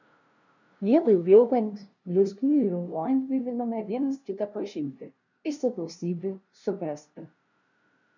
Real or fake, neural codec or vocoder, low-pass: fake; codec, 16 kHz, 0.5 kbps, FunCodec, trained on LibriTTS, 25 frames a second; 7.2 kHz